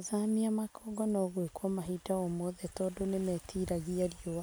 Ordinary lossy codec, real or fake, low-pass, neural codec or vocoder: none; real; none; none